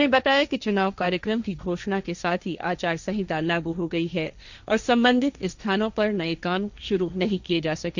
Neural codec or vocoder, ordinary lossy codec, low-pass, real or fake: codec, 16 kHz, 1.1 kbps, Voila-Tokenizer; none; 7.2 kHz; fake